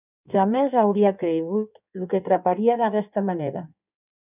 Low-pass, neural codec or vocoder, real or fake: 3.6 kHz; codec, 16 kHz in and 24 kHz out, 1.1 kbps, FireRedTTS-2 codec; fake